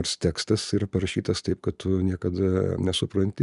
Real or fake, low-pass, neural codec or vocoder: real; 10.8 kHz; none